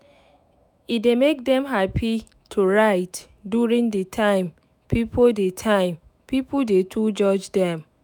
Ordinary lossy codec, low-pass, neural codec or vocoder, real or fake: none; none; autoencoder, 48 kHz, 128 numbers a frame, DAC-VAE, trained on Japanese speech; fake